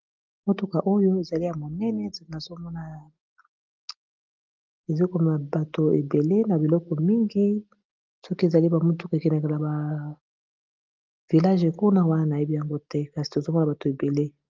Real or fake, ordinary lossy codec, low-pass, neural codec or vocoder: real; Opus, 32 kbps; 7.2 kHz; none